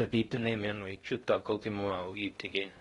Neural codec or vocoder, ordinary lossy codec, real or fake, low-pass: codec, 16 kHz in and 24 kHz out, 0.6 kbps, FocalCodec, streaming, 4096 codes; AAC, 32 kbps; fake; 10.8 kHz